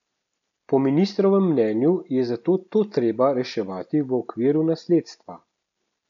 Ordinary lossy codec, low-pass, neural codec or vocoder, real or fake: none; 7.2 kHz; none; real